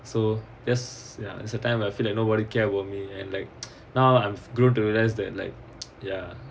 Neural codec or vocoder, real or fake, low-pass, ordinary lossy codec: none; real; none; none